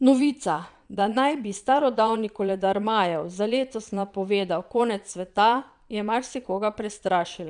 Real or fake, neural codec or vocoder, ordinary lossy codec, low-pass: fake; vocoder, 22.05 kHz, 80 mel bands, Vocos; none; 9.9 kHz